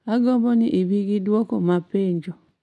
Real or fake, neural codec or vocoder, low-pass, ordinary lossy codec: real; none; none; none